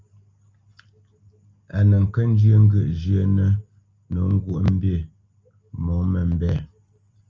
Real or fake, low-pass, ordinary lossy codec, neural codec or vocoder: real; 7.2 kHz; Opus, 32 kbps; none